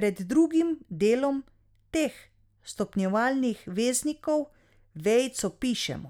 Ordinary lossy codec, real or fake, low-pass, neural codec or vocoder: none; real; 19.8 kHz; none